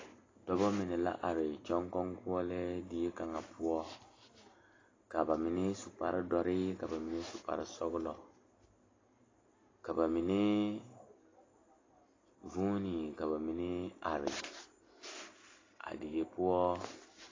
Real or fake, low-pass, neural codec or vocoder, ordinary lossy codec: real; 7.2 kHz; none; AAC, 32 kbps